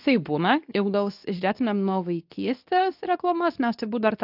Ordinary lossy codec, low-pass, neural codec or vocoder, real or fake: AAC, 48 kbps; 5.4 kHz; codec, 24 kHz, 0.9 kbps, WavTokenizer, medium speech release version 2; fake